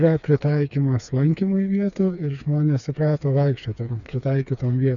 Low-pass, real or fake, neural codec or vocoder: 7.2 kHz; fake; codec, 16 kHz, 4 kbps, FreqCodec, smaller model